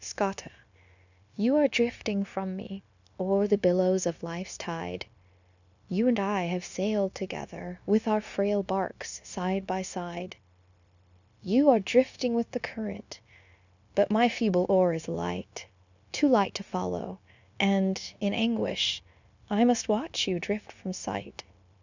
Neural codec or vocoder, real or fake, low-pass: codec, 16 kHz, 0.9 kbps, LongCat-Audio-Codec; fake; 7.2 kHz